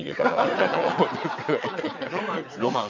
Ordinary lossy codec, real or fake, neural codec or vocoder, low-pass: none; fake; vocoder, 22.05 kHz, 80 mel bands, WaveNeXt; 7.2 kHz